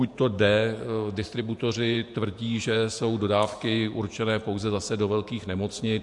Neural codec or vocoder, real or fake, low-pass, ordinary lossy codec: vocoder, 48 kHz, 128 mel bands, Vocos; fake; 10.8 kHz; MP3, 64 kbps